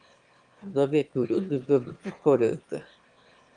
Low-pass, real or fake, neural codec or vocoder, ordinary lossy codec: 9.9 kHz; fake; autoencoder, 22.05 kHz, a latent of 192 numbers a frame, VITS, trained on one speaker; Opus, 32 kbps